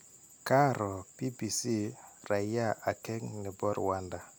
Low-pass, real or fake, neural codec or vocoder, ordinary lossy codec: none; real; none; none